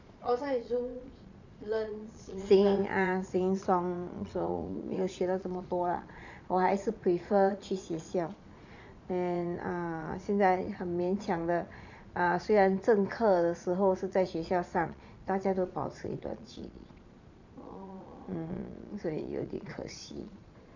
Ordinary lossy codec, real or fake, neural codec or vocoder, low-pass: none; fake; vocoder, 22.05 kHz, 80 mel bands, Vocos; 7.2 kHz